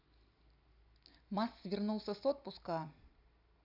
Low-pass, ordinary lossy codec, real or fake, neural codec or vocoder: 5.4 kHz; none; real; none